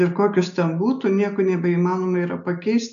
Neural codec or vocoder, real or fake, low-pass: none; real; 7.2 kHz